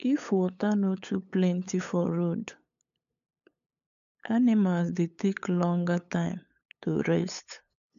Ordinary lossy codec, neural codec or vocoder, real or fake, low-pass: AAC, 64 kbps; codec, 16 kHz, 8 kbps, FunCodec, trained on LibriTTS, 25 frames a second; fake; 7.2 kHz